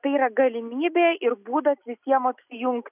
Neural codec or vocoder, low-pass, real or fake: none; 3.6 kHz; real